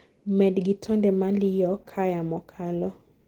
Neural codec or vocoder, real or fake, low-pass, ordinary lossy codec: none; real; 19.8 kHz; Opus, 16 kbps